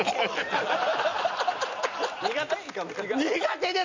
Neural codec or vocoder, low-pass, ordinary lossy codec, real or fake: none; 7.2 kHz; MP3, 64 kbps; real